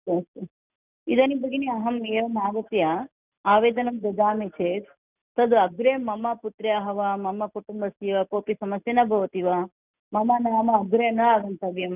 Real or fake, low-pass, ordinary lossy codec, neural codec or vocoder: real; 3.6 kHz; none; none